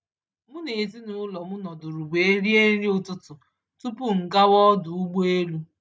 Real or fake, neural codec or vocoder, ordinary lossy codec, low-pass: real; none; none; none